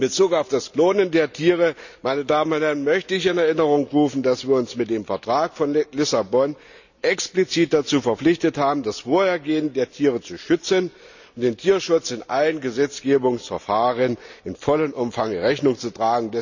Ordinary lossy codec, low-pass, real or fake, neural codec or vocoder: none; 7.2 kHz; real; none